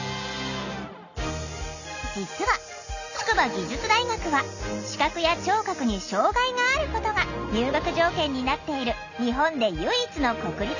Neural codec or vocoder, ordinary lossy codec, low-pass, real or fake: none; none; 7.2 kHz; real